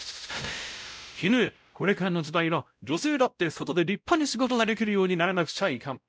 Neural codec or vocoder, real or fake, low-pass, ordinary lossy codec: codec, 16 kHz, 0.5 kbps, X-Codec, WavLM features, trained on Multilingual LibriSpeech; fake; none; none